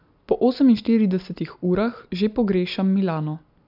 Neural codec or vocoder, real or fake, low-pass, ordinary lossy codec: none; real; 5.4 kHz; AAC, 48 kbps